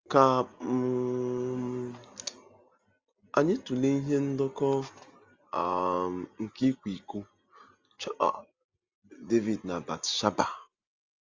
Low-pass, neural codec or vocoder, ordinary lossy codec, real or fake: 7.2 kHz; none; Opus, 32 kbps; real